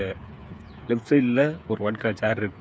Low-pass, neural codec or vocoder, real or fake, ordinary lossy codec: none; codec, 16 kHz, 8 kbps, FreqCodec, smaller model; fake; none